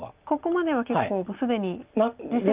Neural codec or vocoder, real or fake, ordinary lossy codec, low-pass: none; real; Opus, 24 kbps; 3.6 kHz